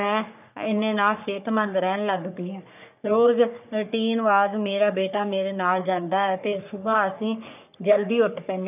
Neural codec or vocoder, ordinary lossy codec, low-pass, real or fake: codec, 44.1 kHz, 3.4 kbps, Pupu-Codec; none; 3.6 kHz; fake